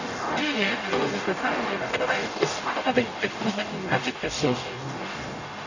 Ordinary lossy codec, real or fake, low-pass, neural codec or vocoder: none; fake; 7.2 kHz; codec, 44.1 kHz, 0.9 kbps, DAC